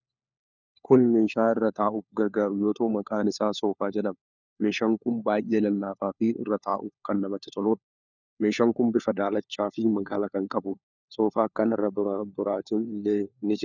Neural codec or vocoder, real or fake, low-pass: codec, 16 kHz, 4 kbps, FunCodec, trained on LibriTTS, 50 frames a second; fake; 7.2 kHz